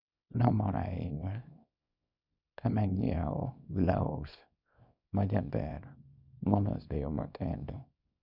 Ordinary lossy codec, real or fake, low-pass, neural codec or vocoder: none; fake; 5.4 kHz; codec, 24 kHz, 0.9 kbps, WavTokenizer, small release